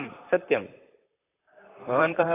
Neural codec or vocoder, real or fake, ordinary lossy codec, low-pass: vocoder, 44.1 kHz, 128 mel bands, Pupu-Vocoder; fake; AAC, 16 kbps; 3.6 kHz